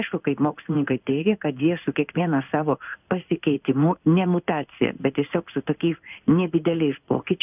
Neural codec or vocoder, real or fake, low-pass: codec, 16 kHz in and 24 kHz out, 1 kbps, XY-Tokenizer; fake; 3.6 kHz